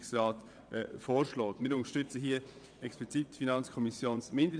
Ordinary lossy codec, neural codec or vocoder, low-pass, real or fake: none; vocoder, 22.05 kHz, 80 mel bands, WaveNeXt; 9.9 kHz; fake